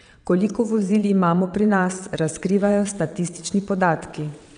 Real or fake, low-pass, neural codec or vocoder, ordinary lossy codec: fake; 9.9 kHz; vocoder, 22.05 kHz, 80 mel bands, WaveNeXt; none